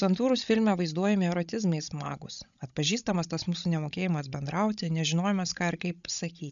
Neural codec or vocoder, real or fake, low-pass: codec, 16 kHz, 16 kbps, FreqCodec, larger model; fake; 7.2 kHz